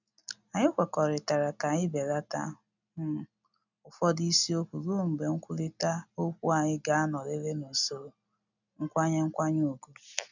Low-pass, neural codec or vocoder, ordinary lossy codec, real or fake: 7.2 kHz; none; none; real